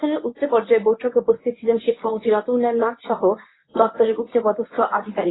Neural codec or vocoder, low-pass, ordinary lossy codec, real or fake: codec, 24 kHz, 0.9 kbps, WavTokenizer, medium speech release version 1; 7.2 kHz; AAC, 16 kbps; fake